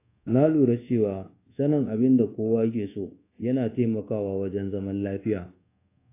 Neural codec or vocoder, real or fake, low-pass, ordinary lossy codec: codec, 24 kHz, 1.2 kbps, DualCodec; fake; 3.6 kHz; AAC, 24 kbps